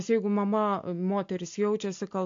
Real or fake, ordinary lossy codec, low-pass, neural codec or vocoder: real; AAC, 48 kbps; 7.2 kHz; none